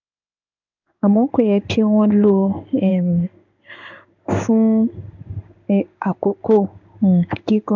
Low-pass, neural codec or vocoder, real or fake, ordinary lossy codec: 7.2 kHz; codec, 16 kHz in and 24 kHz out, 1 kbps, XY-Tokenizer; fake; none